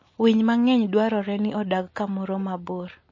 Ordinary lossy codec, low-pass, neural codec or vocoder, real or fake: MP3, 32 kbps; 7.2 kHz; none; real